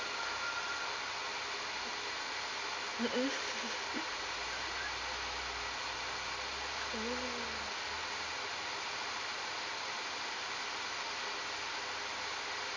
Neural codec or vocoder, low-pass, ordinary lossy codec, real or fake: none; 7.2 kHz; MP3, 48 kbps; real